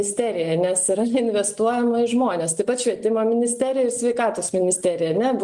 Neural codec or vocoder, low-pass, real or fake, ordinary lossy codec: none; 10.8 kHz; real; Opus, 24 kbps